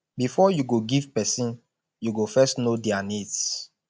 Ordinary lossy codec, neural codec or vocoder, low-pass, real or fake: none; none; none; real